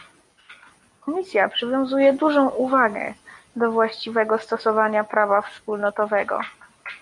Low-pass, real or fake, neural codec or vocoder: 10.8 kHz; real; none